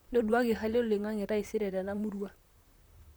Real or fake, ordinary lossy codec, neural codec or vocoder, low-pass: fake; none; vocoder, 44.1 kHz, 128 mel bands, Pupu-Vocoder; none